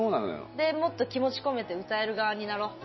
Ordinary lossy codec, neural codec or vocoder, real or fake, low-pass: MP3, 24 kbps; none; real; 7.2 kHz